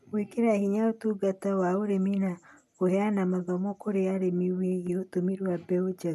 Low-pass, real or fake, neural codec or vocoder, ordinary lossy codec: 14.4 kHz; real; none; none